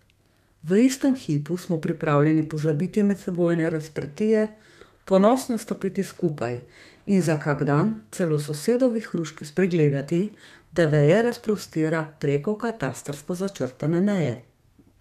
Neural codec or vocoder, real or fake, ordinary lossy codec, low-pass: codec, 32 kHz, 1.9 kbps, SNAC; fake; none; 14.4 kHz